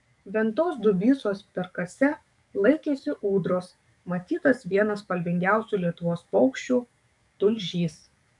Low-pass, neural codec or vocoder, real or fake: 10.8 kHz; codec, 44.1 kHz, 7.8 kbps, DAC; fake